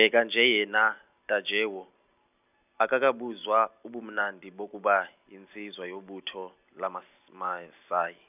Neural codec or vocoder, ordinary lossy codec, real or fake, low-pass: none; none; real; 3.6 kHz